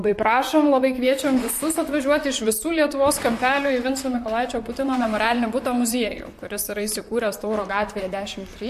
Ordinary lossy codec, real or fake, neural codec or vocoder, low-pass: MP3, 64 kbps; fake; vocoder, 44.1 kHz, 128 mel bands, Pupu-Vocoder; 14.4 kHz